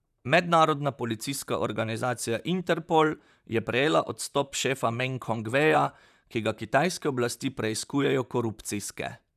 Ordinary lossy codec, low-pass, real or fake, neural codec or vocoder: none; 14.4 kHz; fake; vocoder, 44.1 kHz, 128 mel bands, Pupu-Vocoder